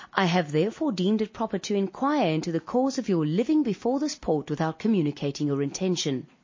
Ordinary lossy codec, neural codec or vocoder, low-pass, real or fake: MP3, 32 kbps; none; 7.2 kHz; real